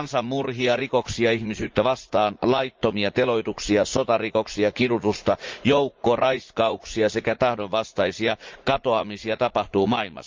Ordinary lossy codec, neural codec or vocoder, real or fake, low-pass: Opus, 24 kbps; vocoder, 44.1 kHz, 80 mel bands, Vocos; fake; 7.2 kHz